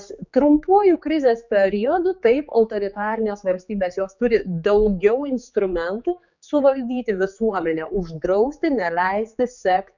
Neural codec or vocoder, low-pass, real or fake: codec, 16 kHz, 4 kbps, X-Codec, HuBERT features, trained on general audio; 7.2 kHz; fake